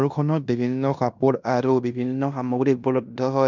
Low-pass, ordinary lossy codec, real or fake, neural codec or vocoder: 7.2 kHz; none; fake; codec, 16 kHz in and 24 kHz out, 0.9 kbps, LongCat-Audio-Codec, fine tuned four codebook decoder